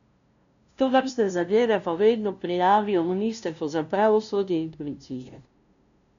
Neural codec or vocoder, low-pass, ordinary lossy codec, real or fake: codec, 16 kHz, 0.5 kbps, FunCodec, trained on LibriTTS, 25 frames a second; 7.2 kHz; none; fake